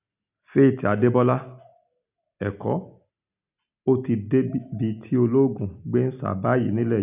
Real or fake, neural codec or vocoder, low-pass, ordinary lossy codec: real; none; 3.6 kHz; none